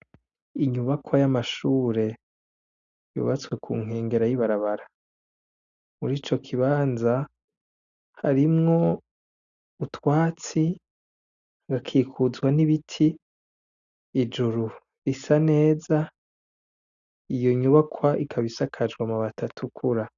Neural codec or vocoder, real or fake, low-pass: none; real; 7.2 kHz